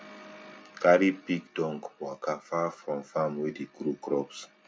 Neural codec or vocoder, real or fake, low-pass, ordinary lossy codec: none; real; none; none